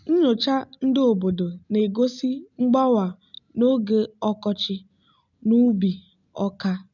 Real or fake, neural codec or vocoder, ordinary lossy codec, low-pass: real; none; none; 7.2 kHz